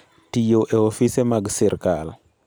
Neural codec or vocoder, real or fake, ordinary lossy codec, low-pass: none; real; none; none